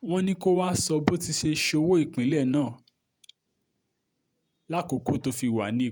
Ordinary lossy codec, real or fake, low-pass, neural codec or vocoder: none; fake; none; vocoder, 48 kHz, 128 mel bands, Vocos